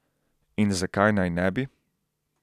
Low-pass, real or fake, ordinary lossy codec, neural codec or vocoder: 14.4 kHz; real; none; none